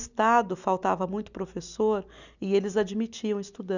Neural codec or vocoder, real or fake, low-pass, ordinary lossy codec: none; real; 7.2 kHz; none